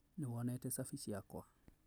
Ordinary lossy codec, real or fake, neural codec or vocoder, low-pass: none; real; none; none